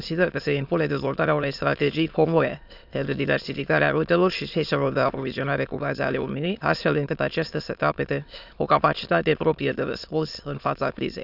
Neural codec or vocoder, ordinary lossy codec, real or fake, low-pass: autoencoder, 22.05 kHz, a latent of 192 numbers a frame, VITS, trained on many speakers; none; fake; 5.4 kHz